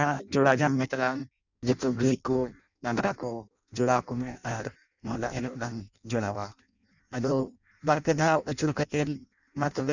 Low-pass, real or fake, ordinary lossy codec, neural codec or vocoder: 7.2 kHz; fake; none; codec, 16 kHz in and 24 kHz out, 0.6 kbps, FireRedTTS-2 codec